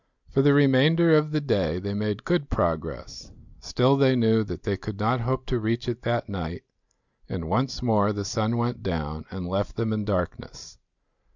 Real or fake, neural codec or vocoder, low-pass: real; none; 7.2 kHz